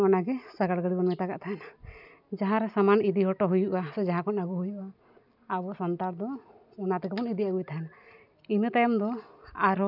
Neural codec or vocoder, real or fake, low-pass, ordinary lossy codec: none; real; 5.4 kHz; none